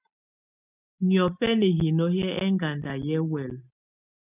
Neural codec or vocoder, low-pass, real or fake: none; 3.6 kHz; real